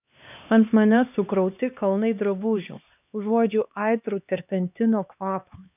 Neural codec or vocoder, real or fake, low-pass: codec, 16 kHz, 2 kbps, X-Codec, HuBERT features, trained on LibriSpeech; fake; 3.6 kHz